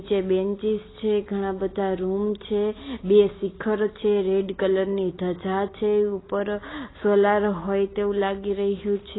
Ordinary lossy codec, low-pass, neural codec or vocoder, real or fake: AAC, 16 kbps; 7.2 kHz; none; real